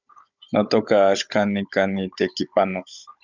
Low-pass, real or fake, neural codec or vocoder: 7.2 kHz; fake; codec, 16 kHz, 16 kbps, FunCodec, trained on Chinese and English, 50 frames a second